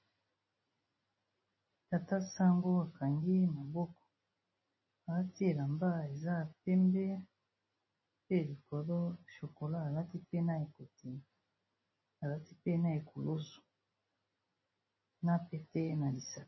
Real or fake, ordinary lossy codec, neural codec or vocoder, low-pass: real; MP3, 24 kbps; none; 7.2 kHz